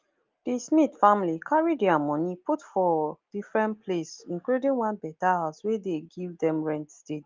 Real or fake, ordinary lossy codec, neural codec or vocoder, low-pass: real; Opus, 32 kbps; none; 7.2 kHz